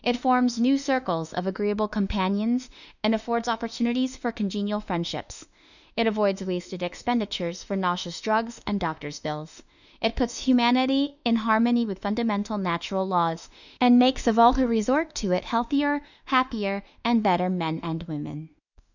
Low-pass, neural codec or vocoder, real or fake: 7.2 kHz; autoencoder, 48 kHz, 32 numbers a frame, DAC-VAE, trained on Japanese speech; fake